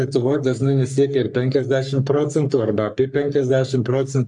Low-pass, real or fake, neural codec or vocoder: 10.8 kHz; fake; codec, 44.1 kHz, 3.4 kbps, Pupu-Codec